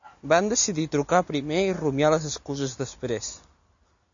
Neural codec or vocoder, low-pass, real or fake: none; 7.2 kHz; real